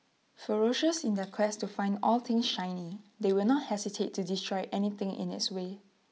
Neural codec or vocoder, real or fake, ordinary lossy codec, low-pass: none; real; none; none